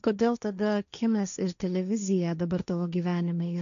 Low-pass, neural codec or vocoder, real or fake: 7.2 kHz; codec, 16 kHz, 1.1 kbps, Voila-Tokenizer; fake